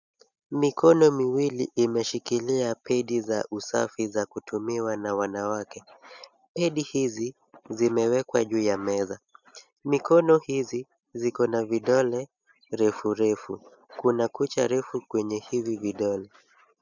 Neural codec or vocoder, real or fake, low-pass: none; real; 7.2 kHz